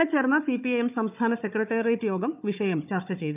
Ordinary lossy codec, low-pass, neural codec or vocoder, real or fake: none; 3.6 kHz; codec, 16 kHz, 4 kbps, FunCodec, trained on Chinese and English, 50 frames a second; fake